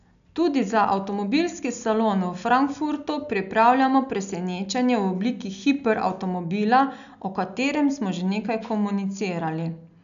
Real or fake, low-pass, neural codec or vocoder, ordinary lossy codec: real; 7.2 kHz; none; none